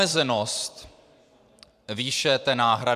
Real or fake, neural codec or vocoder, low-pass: fake; vocoder, 44.1 kHz, 128 mel bands every 512 samples, BigVGAN v2; 14.4 kHz